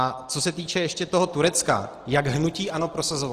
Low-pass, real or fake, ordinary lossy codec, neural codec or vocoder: 14.4 kHz; real; Opus, 16 kbps; none